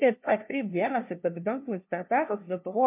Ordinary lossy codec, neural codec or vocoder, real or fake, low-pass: MP3, 32 kbps; codec, 16 kHz, 0.5 kbps, FunCodec, trained on LibriTTS, 25 frames a second; fake; 3.6 kHz